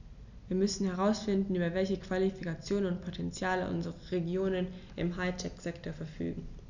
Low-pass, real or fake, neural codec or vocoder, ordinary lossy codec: 7.2 kHz; real; none; none